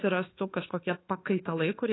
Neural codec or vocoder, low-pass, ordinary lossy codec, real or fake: codec, 24 kHz, 1.2 kbps, DualCodec; 7.2 kHz; AAC, 16 kbps; fake